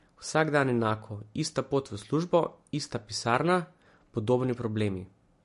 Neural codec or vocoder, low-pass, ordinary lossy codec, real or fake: none; 10.8 kHz; MP3, 48 kbps; real